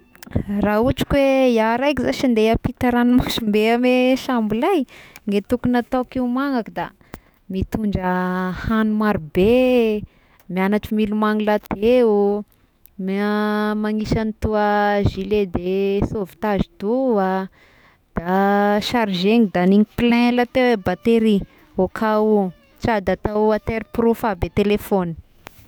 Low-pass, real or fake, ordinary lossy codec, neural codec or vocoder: none; fake; none; autoencoder, 48 kHz, 128 numbers a frame, DAC-VAE, trained on Japanese speech